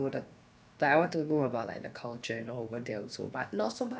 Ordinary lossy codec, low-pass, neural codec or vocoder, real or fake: none; none; codec, 16 kHz, about 1 kbps, DyCAST, with the encoder's durations; fake